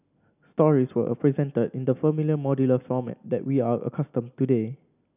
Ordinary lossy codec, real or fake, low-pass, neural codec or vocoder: none; real; 3.6 kHz; none